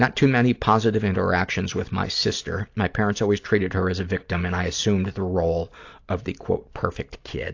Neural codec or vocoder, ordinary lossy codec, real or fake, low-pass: none; AAC, 48 kbps; real; 7.2 kHz